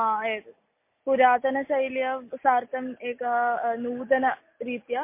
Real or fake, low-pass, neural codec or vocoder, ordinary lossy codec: real; 3.6 kHz; none; MP3, 24 kbps